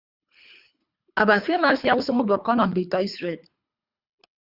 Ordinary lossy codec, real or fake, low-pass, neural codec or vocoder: Opus, 64 kbps; fake; 5.4 kHz; codec, 24 kHz, 3 kbps, HILCodec